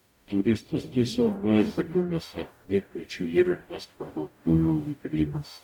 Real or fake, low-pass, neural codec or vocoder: fake; 19.8 kHz; codec, 44.1 kHz, 0.9 kbps, DAC